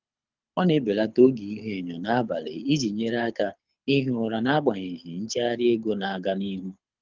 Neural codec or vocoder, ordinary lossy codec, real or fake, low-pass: codec, 24 kHz, 6 kbps, HILCodec; Opus, 32 kbps; fake; 7.2 kHz